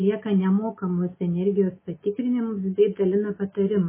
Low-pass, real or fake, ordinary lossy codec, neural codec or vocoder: 3.6 kHz; real; MP3, 16 kbps; none